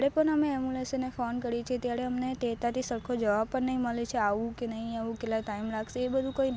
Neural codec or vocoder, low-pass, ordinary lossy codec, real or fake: none; none; none; real